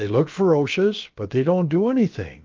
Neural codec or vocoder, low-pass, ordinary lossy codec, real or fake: codec, 16 kHz, about 1 kbps, DyCAST, with the encoder's durations; 7.2 kHz; Opus, 32 kbps; fake